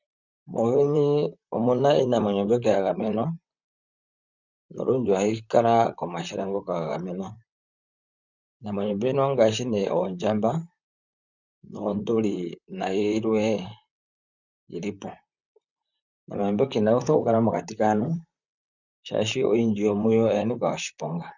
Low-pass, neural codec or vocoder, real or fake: 7.2 kHz; vocoder, 44.1 kHz, 128 mel bands, Pupu-Vocoder; fake